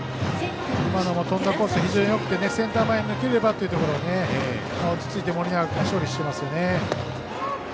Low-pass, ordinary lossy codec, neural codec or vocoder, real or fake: none; none; none; real